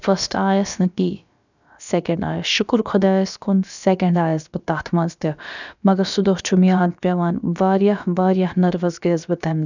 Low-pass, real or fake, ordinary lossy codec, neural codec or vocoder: 7.2 kHz; fake; none; codec, 16 kHz, about 1 kbps, DyCAST, with the encoder's durations